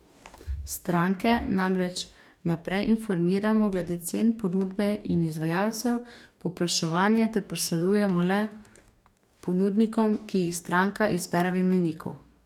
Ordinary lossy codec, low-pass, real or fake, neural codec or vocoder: none; 19.8 kHz; fake; codec, 44.1 kHz, 2.6 kbps, DAC